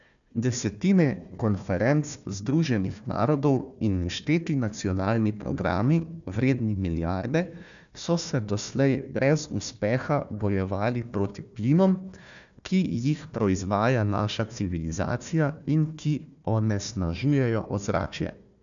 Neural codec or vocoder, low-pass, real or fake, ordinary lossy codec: codec, 16 kHz, 1 kbps, FunCodec, trained on Chinese and English, 50 frames a second; 7.2 kHz; fake; MP3, 96 kbps